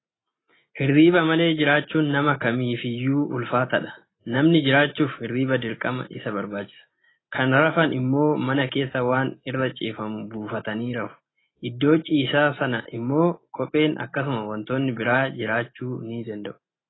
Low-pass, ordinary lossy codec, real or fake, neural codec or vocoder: 7.2 kHz; AAC, 16 kbps; real; none